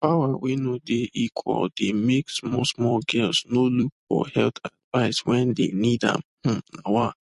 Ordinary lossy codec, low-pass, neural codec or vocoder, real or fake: MP3, 48 kbps; 14.4 kHz; vocoder, 44.1 kHz, 128 mel bands every 256 samples, BigVGAN v2; fake